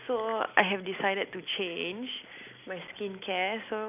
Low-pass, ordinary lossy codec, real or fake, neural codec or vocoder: 3.6 kHz; none; real; none